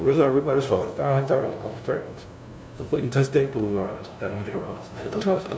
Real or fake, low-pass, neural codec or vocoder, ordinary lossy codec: fake; none; codec, 16 kHz, 0.5 kbps, FunCodec, trained on LibriTTS, 25 frames a second; none